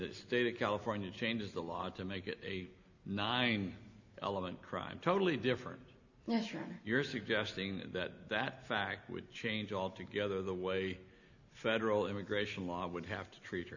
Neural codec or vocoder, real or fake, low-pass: none; real; 7.2 kHz